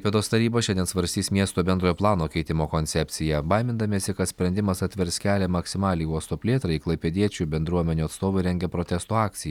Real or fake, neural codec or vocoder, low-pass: real; none; 19.8 kHz